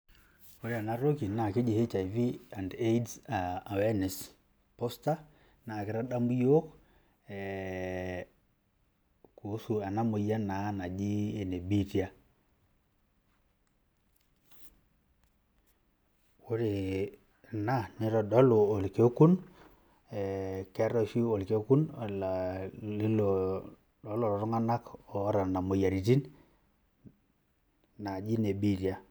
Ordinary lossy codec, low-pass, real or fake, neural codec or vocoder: none; none; real; none